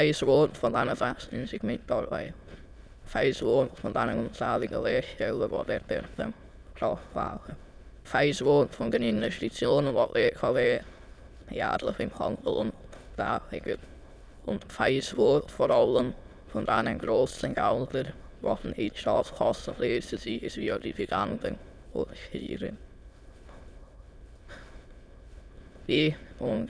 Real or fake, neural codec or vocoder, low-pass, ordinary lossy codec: fake; autoencoder, 22.05 kHz, a latent of 192 numbers a frame, VITS, trained on many speakers; none; none